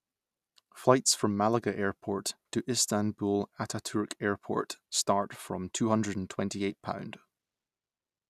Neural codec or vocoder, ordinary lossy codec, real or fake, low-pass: none; none; real; 14.4 kHz